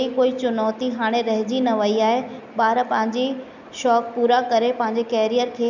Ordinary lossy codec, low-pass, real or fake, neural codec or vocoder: none; 7.2 kHz; real; none